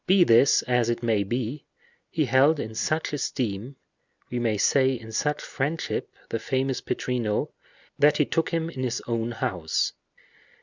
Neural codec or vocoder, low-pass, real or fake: none; 7.2 kHz; real